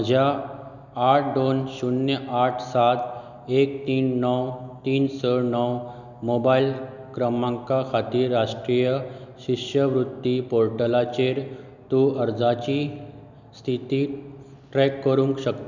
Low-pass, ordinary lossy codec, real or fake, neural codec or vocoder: 7.2 kHz; none; real; none